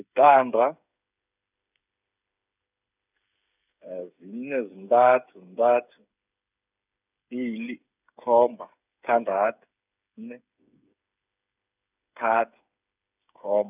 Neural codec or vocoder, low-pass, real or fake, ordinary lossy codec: codec, 16 kHz, 8 kbps, FreqCodec, smaller model; 3.6 kHz; fake; none